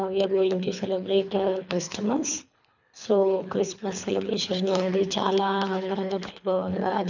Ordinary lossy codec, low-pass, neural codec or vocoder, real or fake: none; 7.2 kHz; codec, 24 kHz, 3 kbps, HILCodec; fake